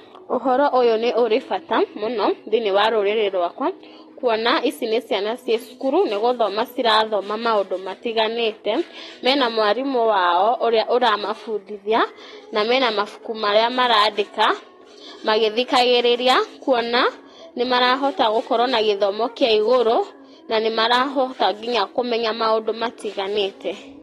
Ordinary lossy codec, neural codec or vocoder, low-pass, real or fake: AAC, 32 kbps; none; 19.8 kHz; real